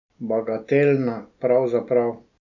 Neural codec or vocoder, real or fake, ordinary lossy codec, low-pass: none; real; none; 7.2 kHz